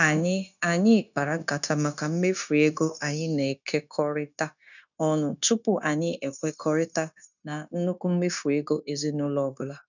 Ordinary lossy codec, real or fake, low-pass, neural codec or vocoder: none; fake; 7.2 kHz; codec, 16 kHz, 0.9 kbps, LongCat-Audio-Codec